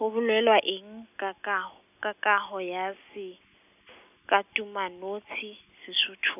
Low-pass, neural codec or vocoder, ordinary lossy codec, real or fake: 3.6 kHz; none; none; real